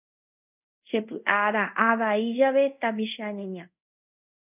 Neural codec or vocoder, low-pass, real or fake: codec, 24 kHz, 0.5 kbps, DualCodec; 3.6 kHz; fake